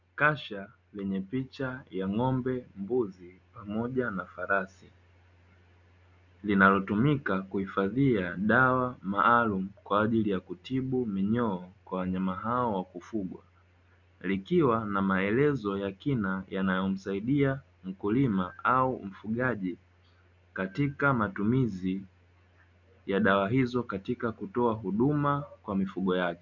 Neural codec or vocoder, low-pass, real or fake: none; 7.2 kHz; real